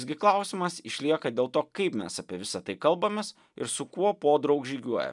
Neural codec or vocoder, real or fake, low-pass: none; real; 10.8 kHz